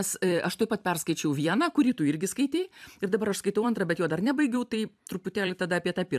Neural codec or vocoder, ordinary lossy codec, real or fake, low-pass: vocoder, 44.1 kHz, 128 mel bands every 256 samples, BigVGAN v2; AAC, 96 kbps; fake; 14.4 kHz